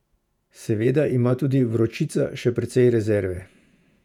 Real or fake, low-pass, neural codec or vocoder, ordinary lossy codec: fake; 19.8 kHz; vocoder, 48 kHz, 128 mel bands, Vocos; none